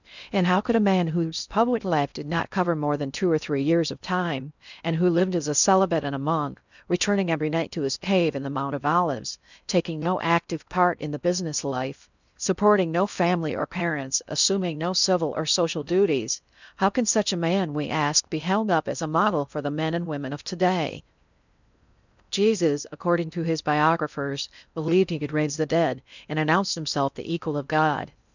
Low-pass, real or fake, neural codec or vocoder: 7.2 kHz; fake; codec, 16 kHz in and 24 kHz out, 0.6 kbps, FocalCodec, streaming, 2048 codes